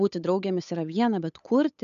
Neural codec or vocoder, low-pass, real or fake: codec, 16 kHz, 8 kbps, FunCodec, trained on Chinese and English, 25 frames a second; 7.2 kHz; fake